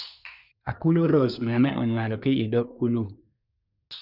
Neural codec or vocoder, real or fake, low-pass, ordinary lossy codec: codec, 24 kHz, 1 kbps, SNAC; fake; 5.4 kHz; none